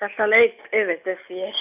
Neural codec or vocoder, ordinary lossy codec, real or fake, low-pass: none; none; real; 3.6 kHz